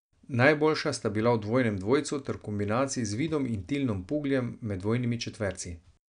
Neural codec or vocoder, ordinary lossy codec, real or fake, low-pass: none; none; real; 9.9 kHz